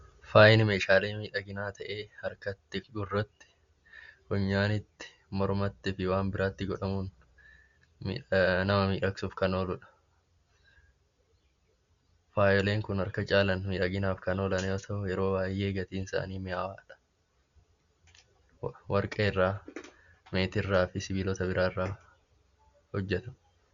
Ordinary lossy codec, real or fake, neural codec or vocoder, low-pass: Opus, 64 kbps; real; none; 7.2 kHz